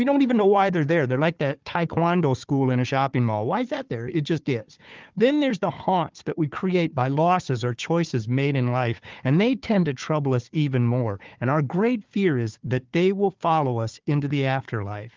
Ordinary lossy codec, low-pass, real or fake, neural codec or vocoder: Opus, 24 kbps; 7.2 kHz; fake; codec, 16 kHz, 4 kbps, FunCodec, trained on Chinese and English, 50 frames a second